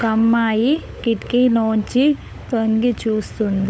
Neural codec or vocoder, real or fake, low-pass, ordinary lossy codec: codec, 16 kHz, 16 kbps, FunCodec, trained on LibriTTS, 50 frames a second; fake; none; none